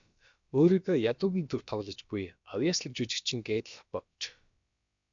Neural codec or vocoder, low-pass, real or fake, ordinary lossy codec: codec, 16 kHz, about 1 kbps, DyCAST, with the encoder's durations; 7.2 kHz; fake; MP3, 64 kbps